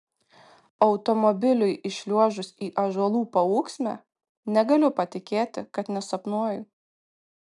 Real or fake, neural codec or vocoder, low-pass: real; none; 10.8 kHz